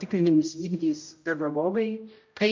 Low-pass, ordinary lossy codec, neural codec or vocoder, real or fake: 7.2 kHz; MP3, 48 kbps; codec, 16 kHz, 0.5 kbps, X-Codec, HuBERT features, trained on general audio; fake